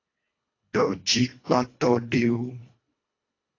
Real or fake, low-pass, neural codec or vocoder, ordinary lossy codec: fake; 7.2 kHz; codec, 24 kHz, 1.5 kbps, HILCodec; AAC, 32 kbps